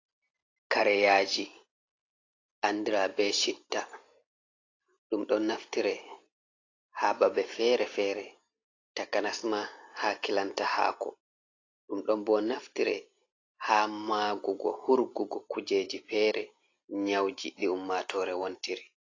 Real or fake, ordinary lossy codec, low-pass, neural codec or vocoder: real; AAC, 32 kbps; 7.2 kHz; none